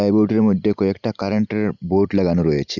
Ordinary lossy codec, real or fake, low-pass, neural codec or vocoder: none; real; 7.2 kHz; none